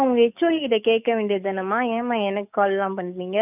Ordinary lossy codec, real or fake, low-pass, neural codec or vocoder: none; fake; 3.6 kHz; codec, 44.1 kHz, 7.8 kbps, DAC